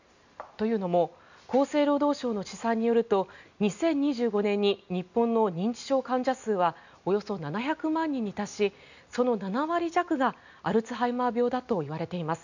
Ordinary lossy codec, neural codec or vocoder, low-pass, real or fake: none; none; 7.2 kHz; real